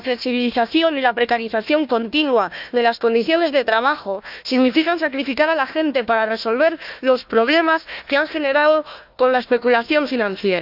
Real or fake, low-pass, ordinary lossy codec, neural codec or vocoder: fake; 5.4 kHz; none; codec, 16 kHz, 1 kbps, FunCodec, trained on Chinese and English, 50 frames a second